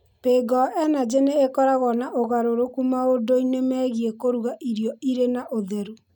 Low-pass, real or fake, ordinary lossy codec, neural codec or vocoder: 19.8 kHz; real; none; none